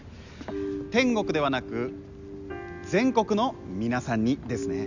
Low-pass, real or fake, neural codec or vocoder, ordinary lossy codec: 7.2 kHz; real; none; none